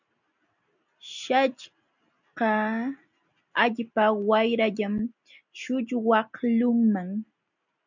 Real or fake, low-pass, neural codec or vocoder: real; 7.2 kHz; none